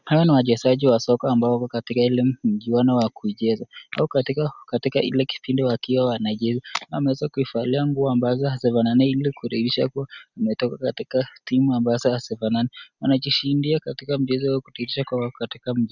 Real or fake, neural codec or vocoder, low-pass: real; none; 7.2 kHz